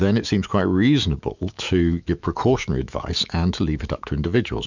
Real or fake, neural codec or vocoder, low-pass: fake; autoencoder, 48 kHz, 128 numbers a frame, DAC-VAE, trained on Japanese speech; 7.2 kHz